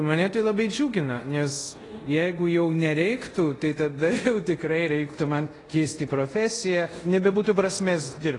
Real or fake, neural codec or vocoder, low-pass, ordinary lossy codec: fake; codec, 24 kHz, 0.5 kbps, DualCodec; 10.8 kHz; AAC, 32 kbps